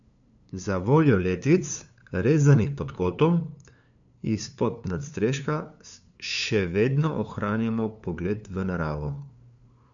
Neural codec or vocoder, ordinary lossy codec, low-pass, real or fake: codec, 16 kHz, 2 kbps, FunCodec, trained on LibriTTS, 25 frames a second; none; 7.2 kHz; fake